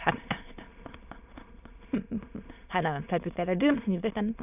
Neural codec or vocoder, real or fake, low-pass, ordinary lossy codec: autoencoder, 22.05 kHz, a latent of 192 numbers a frame, VITS, trained on many speakers; fake; 3.6 kHz; none